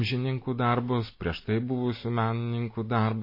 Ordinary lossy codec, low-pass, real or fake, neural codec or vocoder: MP3, 24 kbps; 5.4 kHz; real; none